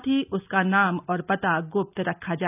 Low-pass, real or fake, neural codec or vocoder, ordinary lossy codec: 3.6 kHz; real; none; none